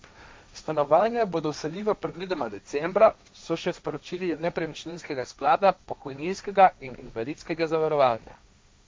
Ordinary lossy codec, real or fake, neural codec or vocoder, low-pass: none; fake; codec, 16 kHz, 1.1 kbps, Voila-Tokenizer; none